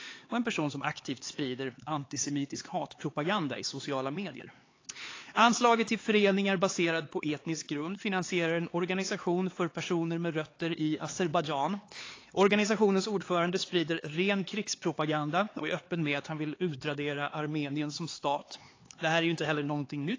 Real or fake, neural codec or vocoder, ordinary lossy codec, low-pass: fake; codec, 16 kHz, 4 kbps, X-Codec, HuBERT features, trained on LibriSpeech; AAC, 32 kbps; 7.2 kHz